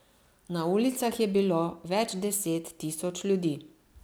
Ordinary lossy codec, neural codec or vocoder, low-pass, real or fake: none; none; none; real